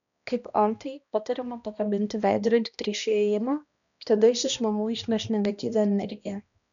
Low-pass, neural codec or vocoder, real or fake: 7.2 kHz; codec, 16 kHz, 1 kbps, X-Codec, HuBERT features, trained on balanced general audio; fake